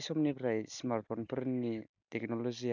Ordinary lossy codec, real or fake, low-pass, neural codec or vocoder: none; real; 7.2 kHz; none